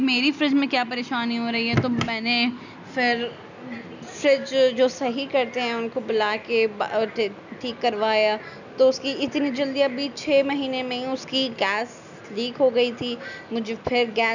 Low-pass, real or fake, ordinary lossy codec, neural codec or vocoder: 7.2 kHz; real; none; none